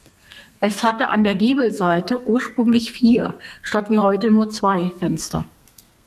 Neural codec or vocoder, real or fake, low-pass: codec, 32 kHz, 1.9 kbps, SNAC; fake; 14.4 kHz